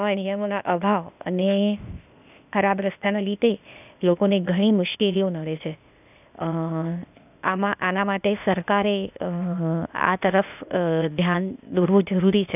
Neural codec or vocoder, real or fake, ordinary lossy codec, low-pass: codec, 16 kHz, 0.8 kbps, ZipCodec; fake; none; 3.6 kHz